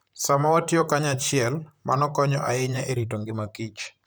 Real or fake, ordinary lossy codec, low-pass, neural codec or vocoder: fake; none; none; vocoder, 44.1 kHz, 128 mel bands, Pupu-Vocoder